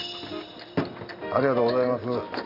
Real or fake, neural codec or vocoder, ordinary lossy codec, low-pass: real; none; none; 5.4 kHz